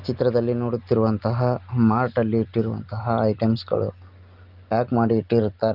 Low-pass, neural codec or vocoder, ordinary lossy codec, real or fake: 5.4 kHz; none; Opus, 32 kbps; real